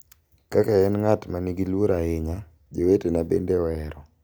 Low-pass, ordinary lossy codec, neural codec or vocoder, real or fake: none; none; none; real